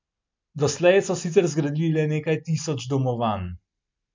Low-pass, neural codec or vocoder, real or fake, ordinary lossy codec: 7.2 kHz; none; real; MP3, 64 kbps